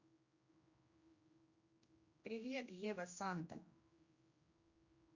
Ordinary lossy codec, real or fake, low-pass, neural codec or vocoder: Opus, 64 kbps; fake; 7.2 kHz; codec, 16 kHz, 1 kbps, X-Codec, HuBERT features, trained on general audio